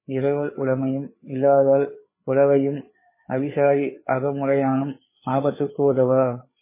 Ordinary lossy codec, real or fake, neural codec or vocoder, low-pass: MP3, 16 kbps; fake; codec, 16 kHz, 4 kbps, FreqCodec, larger model; 3.6 kHz